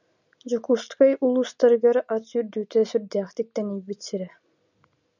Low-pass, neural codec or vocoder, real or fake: 7.2 kHz; none; real